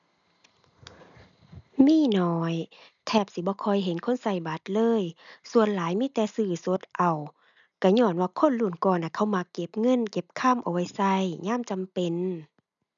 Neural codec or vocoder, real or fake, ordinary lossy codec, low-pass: none; real; none; 7.2 kHz